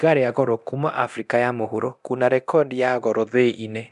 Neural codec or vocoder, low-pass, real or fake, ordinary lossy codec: codec, 24 kHz, 0.9 kbps, DualCodec; 10.8 kHz; fake; none